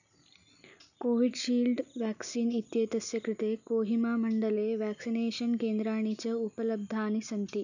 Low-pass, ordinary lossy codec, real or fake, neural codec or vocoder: 7.2 kHz; none; real; none